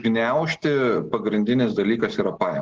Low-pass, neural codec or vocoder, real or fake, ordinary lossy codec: 7.2 kHz; none; real; Opus, 16 kbps